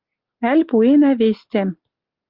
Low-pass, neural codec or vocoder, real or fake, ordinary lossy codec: 5.4 kHz; none; real; Opus, 24 kbps